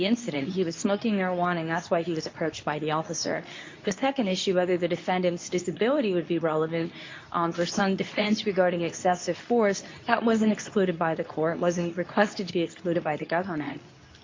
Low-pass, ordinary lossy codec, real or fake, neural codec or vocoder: 7.2 kHz; MP3, 48 kbps; fake; codec, 24 kHz, 0.9 kbps, WavTokenizer, medium speech release version 2